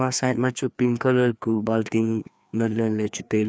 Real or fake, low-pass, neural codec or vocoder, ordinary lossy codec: fake; none; codec, 16 kHz, 2 kbps, FreqCodec, larger model; none